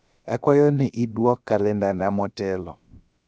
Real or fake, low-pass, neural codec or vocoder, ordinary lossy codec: fake; none; codec, 16 kHz, about 1 kbps, DyCAST, with the encoder's durations; none